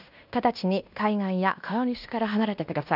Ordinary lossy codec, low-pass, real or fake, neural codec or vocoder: none; 5.4 kHz; fake; codec, 16 kHz in and 24 kHz out, 0.9 kbps, LongCat-Audio-Codec, fine tuned four codebook decoder